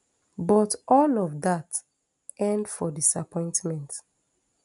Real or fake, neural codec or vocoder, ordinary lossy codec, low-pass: real; none; none; 10.8 kHz